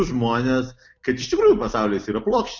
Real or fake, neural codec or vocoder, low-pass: real; none; 7.2 kHz